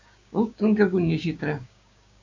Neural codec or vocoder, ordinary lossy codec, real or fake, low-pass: codec, 16 kHz, 6 kbps, DAC; AAC, 48 kbps; fake; 7.2 kHz